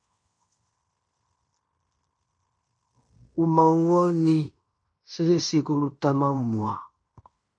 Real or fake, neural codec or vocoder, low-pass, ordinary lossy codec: fake; codec, 16 kHz in and 24 kHz out, 0.9 kbps, LongCat-Audio-Codec, fine tuned four codebook decoder; 9.9 kHz; MP3, 64 kbps